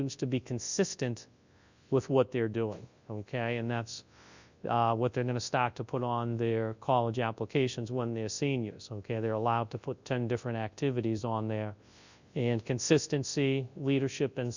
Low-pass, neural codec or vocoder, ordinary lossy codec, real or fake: 7.2 kHz; codec, 24 kHz, 0.9 kbps, WavTokenizer, large speech release; Opus, 64 kbps; fake